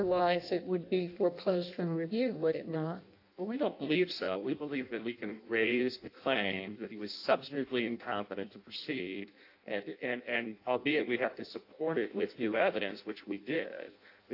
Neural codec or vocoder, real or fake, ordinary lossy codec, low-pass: codec, 16 kHz in and 24 kHz out, 0.6 kbps, FireRedTTS-2 codec; fake; AAC, 32 kbps; 5.4 kHz